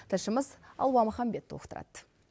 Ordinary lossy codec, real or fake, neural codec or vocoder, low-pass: none; real; none; none